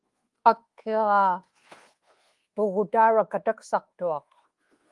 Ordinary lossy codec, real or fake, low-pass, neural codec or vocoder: Opus, 24 kbps; fake; 10.8 kHz; codec, 24 kHz, 1.2 kbps, DualCodec